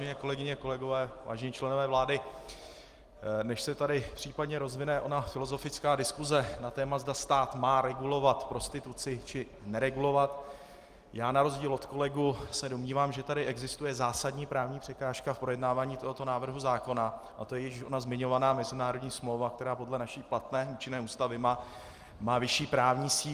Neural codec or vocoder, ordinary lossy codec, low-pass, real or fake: none; Opus, 24 kbps; 14.4 kHz; real